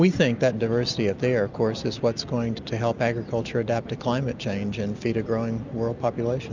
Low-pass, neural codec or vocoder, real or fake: 7.2 kHz; none; real